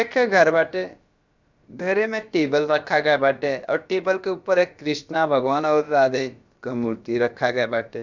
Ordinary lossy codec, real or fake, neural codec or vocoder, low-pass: Opus, 64 kbps; fake; codec, 16 kHz, about 1 kbps, DyCAST, with the encoder's durations; 7.2 kHz